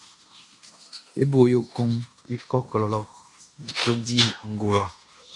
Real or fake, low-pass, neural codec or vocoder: fake; 10.8 kHz; codec, 16 kHz in and 24 kHz out, 0.9 kbps, LongCat-Audio-Codec, fine tuned four codebook decoder